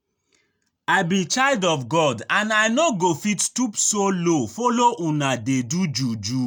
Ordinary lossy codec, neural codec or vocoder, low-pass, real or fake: none; none; none; real